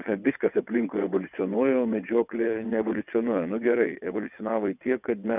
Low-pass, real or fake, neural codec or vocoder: 3.6 kHz; fake; vocoder, 22.05 kHz, 80 mel bands, WaveNeXt